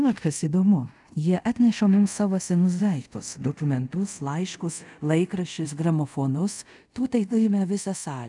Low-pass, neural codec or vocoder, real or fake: 10.8 kHz; codec, 24 kHz, 0.5 kbps, DualCodec; fake